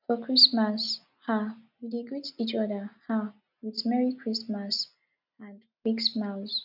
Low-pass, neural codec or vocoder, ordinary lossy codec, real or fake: 5.4 kHz; none; none; real